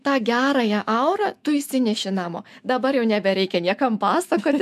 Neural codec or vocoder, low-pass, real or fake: autoencoder, 48 kHz, 128 numbers a frame, DAC-VAE, trained on Japanese speech; 14.4 kHz; fake